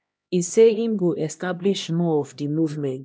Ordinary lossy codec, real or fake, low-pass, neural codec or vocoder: none; fake; none; codec, 16 kHz, 1 kbps, X-Codec, HuBERT features, trained on LibriSpeech